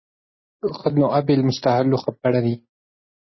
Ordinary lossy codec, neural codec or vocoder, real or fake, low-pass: MP3, 24 kbps; none; real; 7.2 kHz